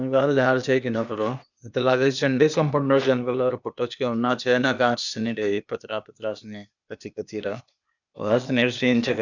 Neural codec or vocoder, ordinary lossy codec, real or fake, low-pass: codec, 16 kHz, 0.8 kbps, ZipCodec; none; fake; 7.2 kHz